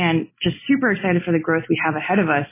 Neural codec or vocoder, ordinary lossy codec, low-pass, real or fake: none; MP3, 16 kbps; 3.6 kHz; real